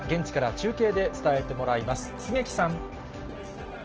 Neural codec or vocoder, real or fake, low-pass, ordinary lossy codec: none; real; 7.2 kHz; Opus, 16 kbps